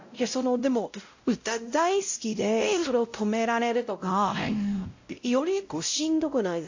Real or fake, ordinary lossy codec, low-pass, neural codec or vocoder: fake; AAC, 48 kbps; 7.2 kHz; codec, 16 kHz, 0.5 kbps, X-Codec, WavLM features, trained on Multilingual LibriSpeech